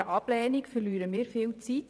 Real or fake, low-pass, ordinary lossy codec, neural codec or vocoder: fake; none; none; vocoder, 22.05 kHz, 80 mel bands, WaveNeXt